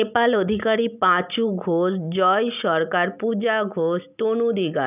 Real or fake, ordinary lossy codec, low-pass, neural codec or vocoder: real; none; 3.6 kHz; none